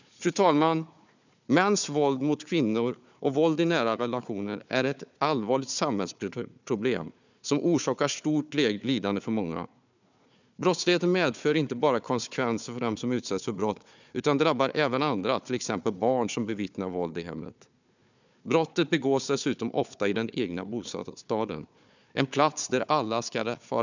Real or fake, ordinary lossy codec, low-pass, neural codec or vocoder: fake; none; 7.2 kHz; codec, 16 kHz, 4 kbps, FunCodec, trained on Chinese and English, 50 frames a second